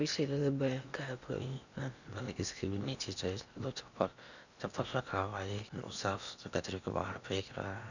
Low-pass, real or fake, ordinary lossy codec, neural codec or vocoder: 7.2 kHz; fake; none; codec, 16 kHz in and 24 kHz out, 0.8 kbps, FocalCodec, streaming, 65536 codes